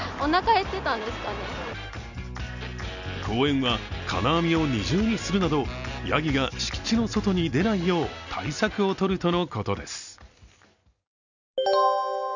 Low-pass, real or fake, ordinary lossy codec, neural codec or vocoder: 7.2 kHz; real; none; none